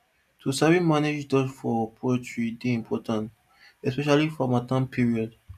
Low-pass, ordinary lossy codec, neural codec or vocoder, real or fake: 14.4 kHz; none; none; real